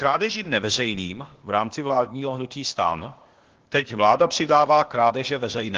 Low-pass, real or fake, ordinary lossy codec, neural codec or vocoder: 7.2 kHz; fake; Opus, 16 kbps; codec, 16 kHz, 0.8 kbps, ZipCodec